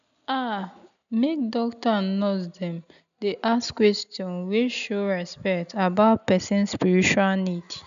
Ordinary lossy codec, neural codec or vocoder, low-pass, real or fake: MP3, 96 kbps; none; 7.2 kHz; real